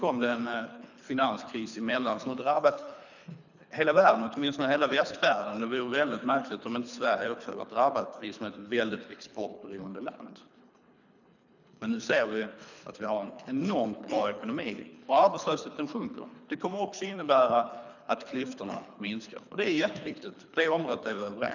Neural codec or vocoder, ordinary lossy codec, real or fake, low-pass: codec, 24 kHz, 3 kbps, HILCodec; none; fake; 7.2 kHz